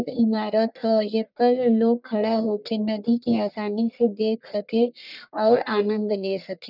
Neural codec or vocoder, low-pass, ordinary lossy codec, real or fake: codec, 44.1 kHz, 1.7 kbps, Pupu-Codec; 5.4 kHz; none; fake